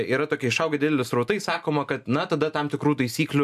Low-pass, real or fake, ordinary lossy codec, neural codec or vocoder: 14.4 kHz; real; AAC, 96 kbps; none